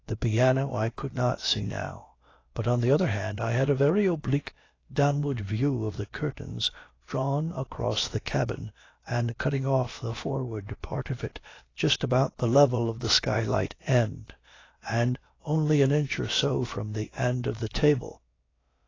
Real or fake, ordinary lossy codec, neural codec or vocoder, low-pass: fake; AAC, 32 kbps; codec, 16 kHz, about 1 kbps, DyCAST, with the encoder's durations; 7.2 kHz